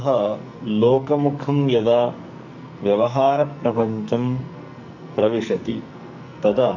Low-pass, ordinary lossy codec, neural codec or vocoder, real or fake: 7.2 kHz; none; codec, 44.1 kHz, 2.6 kbps, SNAC; fake